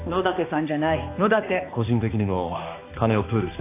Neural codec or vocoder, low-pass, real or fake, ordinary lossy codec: codec, 16 kHz, 2 kbps, X-Codec, HuBERT features, trained on balanced general audio; 3.6 kHz; fake; AAC, 24 kbps